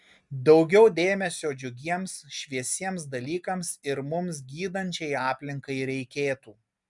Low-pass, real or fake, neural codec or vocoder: 10.8 kHz; real; none